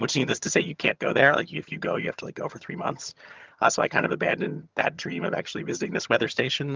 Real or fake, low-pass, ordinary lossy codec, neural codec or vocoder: fake; 7.2 kHz; Opus, 24 kbps; vocoder, 22.05 kHz, 80 mel bands, HiFi-GAN